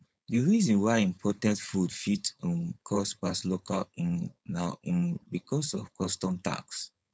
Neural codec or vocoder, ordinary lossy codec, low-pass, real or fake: codec, 16 kHz, 4.8 kbps, FACodec; none; none; fake